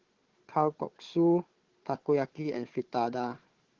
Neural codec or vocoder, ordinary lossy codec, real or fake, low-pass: codec, 16 kHz, 4 kbps, FunCodec, trained on Chinese and English, 50 frames a second; Opus, 16 kbps; fake; 7.2 kHz